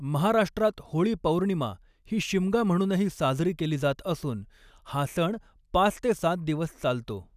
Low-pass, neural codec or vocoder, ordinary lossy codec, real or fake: 14.4 kHz; none; none; real